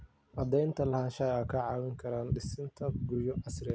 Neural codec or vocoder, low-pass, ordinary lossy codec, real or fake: none; none; none; real